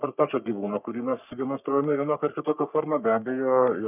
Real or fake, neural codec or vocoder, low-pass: fake; codec, 44.1 kHz, 3.4 kbps, Pupu-Codec; 3.6 kHz